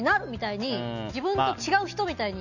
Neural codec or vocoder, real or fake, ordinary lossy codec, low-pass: none; real; none; 7.2 kHz